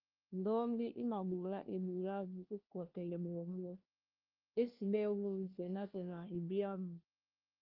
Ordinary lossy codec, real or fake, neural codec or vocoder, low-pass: Opus, 32 kbps; fake; codec, 16 kHz, 1 kbps, FunCodec, trained on LibriTTS, 50 frames a second; 5.4 kHz